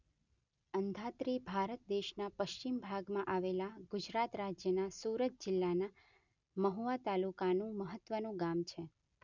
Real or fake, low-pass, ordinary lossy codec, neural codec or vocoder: real; 7.2 kHz; none; none